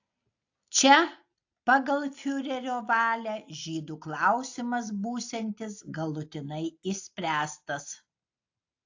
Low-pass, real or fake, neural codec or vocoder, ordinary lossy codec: 7.2 kHz; real; none; AAC, 48 kbps